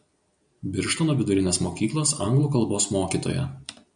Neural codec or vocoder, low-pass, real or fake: none; 9.9 kHz; real